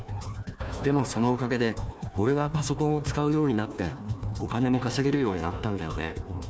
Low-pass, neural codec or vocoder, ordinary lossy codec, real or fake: none; codec, 16 kHz, 1 kbps, FunCodec, trained on Chinese and English, 50 frames a second; none; fake